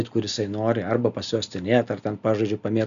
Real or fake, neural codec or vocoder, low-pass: real; none; 7.2 kHz